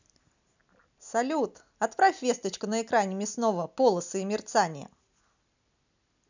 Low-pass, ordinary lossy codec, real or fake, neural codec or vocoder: 7.2 kHz; none; real; none